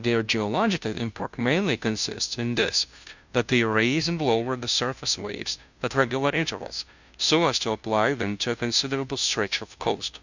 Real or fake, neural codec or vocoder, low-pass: fake; codec, 16 kHz, 0.5 kbps, FunCodec, trained on Chinese and English, 25 frames a second; 7.2 kHz